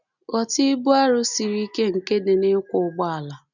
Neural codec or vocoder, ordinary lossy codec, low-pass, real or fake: none; none; 7.2 kHz; real